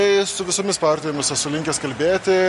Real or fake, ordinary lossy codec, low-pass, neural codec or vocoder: real; MP3, 48 kbps; 14.4 kHz; none